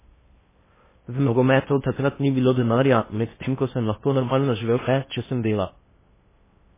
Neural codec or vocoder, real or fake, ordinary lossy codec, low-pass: codec, 16 kHz in and 24 kHz out, 0.8 kbps, FocalCodec, streaming, 65536 codes; fake; MP3, 16 kbps; 3.6 kHz